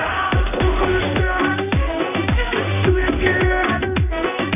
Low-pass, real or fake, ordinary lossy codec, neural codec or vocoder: 3.6 kHz; fake; none; codec, 16 kHz in and 24 kHz out, 1 kbps, XY-Tokenizer